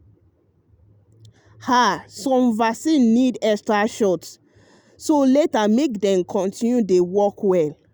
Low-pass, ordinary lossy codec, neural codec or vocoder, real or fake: none; none; none; real